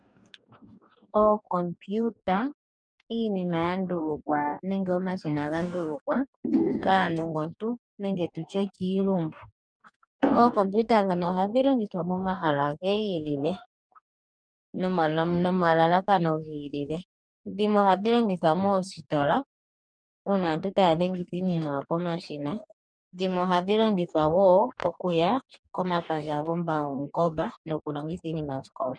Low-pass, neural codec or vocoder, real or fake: 9.9 kHz; codec, 44.1 kHz, 2.6 kbps, DAC; fake